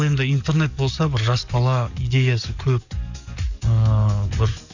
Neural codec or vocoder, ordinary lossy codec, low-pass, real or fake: codec, 44.1 kHz, 7.8 kbps, DAC; none; 7.2 kHz; fake